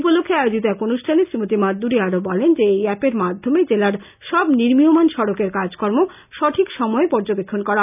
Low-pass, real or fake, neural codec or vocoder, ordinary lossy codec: 3.6 kHz; real; none; none